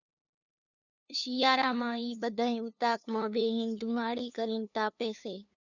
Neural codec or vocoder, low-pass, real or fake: codec, 16 kHz, 2 kbps, FunCodec, trained on LibriTTS, 25 frames a second; 7.2 kHz; fake